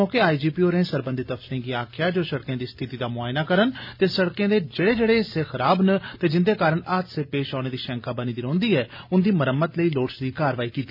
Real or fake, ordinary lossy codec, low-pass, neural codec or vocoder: real; none; 5.4 kHz; none